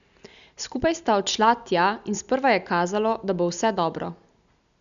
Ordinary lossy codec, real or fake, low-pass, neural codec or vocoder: none; real; 7.2 kHz; none